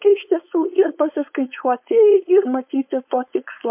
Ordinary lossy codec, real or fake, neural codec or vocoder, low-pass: MP3, 32 kbps; fake; codec, 16 kHz, 4.8 kbps, FACodec; 3.6 kHz